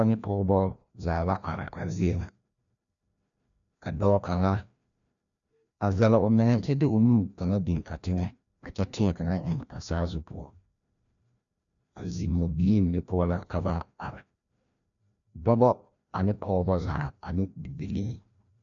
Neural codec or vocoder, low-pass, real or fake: codec, 16 kHz, 1 kbps, FreqCodec, larger model; 7.2 kHz; fake